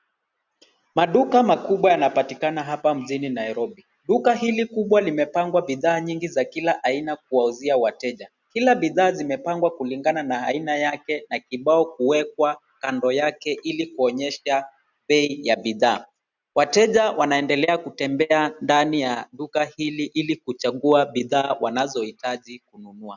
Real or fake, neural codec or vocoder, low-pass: real; none; 7.2 kHz